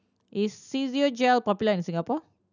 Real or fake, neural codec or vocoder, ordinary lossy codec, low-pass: real; none; none; 7.2 kHz